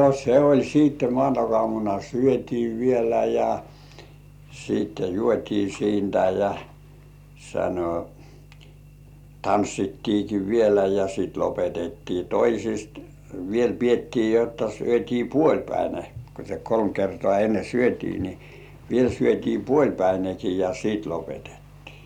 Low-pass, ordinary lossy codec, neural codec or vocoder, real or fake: 19.8 kHz; none; none; real